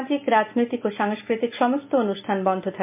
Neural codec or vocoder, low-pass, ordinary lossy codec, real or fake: none; 3.6 kHz; MP3, 32 kbps; real